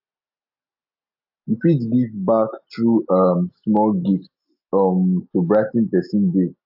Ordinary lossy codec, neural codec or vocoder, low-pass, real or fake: AAC, 48 kbps; none; 5.4 kHz; real